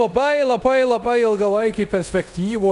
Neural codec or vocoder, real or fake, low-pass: codec, 24 kHz, 0.5 kbps, DualCodec; fake; 10.8 kHz